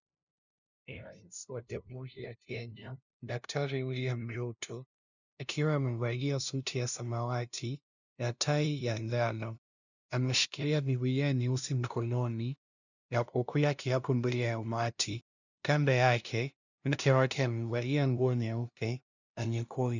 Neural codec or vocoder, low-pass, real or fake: codec, 16 kHz, 0.5 kbps, FunCodec, trained on LibriTTS, 25 frames a second; 7.2 kHz; fake